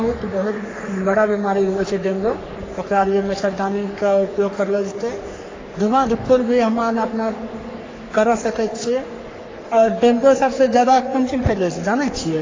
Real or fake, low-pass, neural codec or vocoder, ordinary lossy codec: fake; 7.2 kHz; codec, 44.1 kHz, 3.4 kbps, Pupu-Codec; AAC, 32 kbps